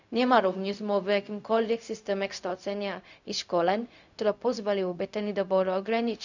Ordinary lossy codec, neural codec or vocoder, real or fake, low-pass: none; codec, 16 kHz, 0.4 kbps, LongCat-Audio-Codec; fake; 7.2 kHz